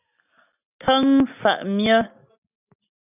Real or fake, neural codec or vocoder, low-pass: real; none; 3.6 kHz